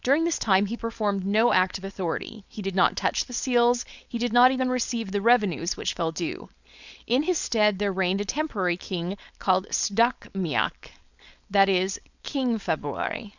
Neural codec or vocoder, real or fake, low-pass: codec, 16 kHz, 4.8 kbps, FACodec; fake; 7.2 kHz